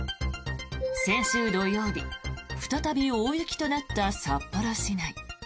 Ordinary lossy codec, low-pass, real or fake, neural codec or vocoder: none; none; real; none